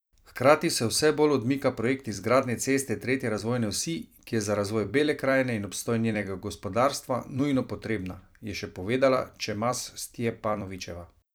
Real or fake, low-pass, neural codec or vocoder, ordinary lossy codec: fake; none; vocoder, 44.1 kHz, 128 mel bands every 256 samples, BigVGAN v2; none